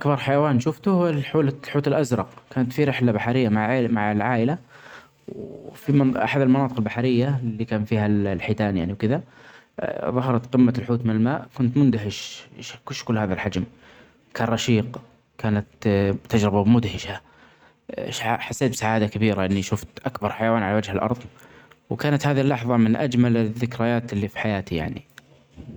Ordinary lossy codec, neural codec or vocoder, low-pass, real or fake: none; none; 19.8 kHz; real